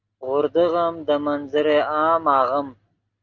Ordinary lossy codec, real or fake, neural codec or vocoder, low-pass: Opus, 24 kbps; real; none; 7.2 kHz